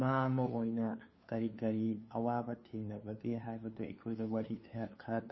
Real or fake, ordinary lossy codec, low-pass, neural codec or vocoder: fake; MP3, 24 kbps; 7.2 kHz; codec, 16 kHz, 2 kbps, FunCodec, trained on LibriTTS, 25 frames a second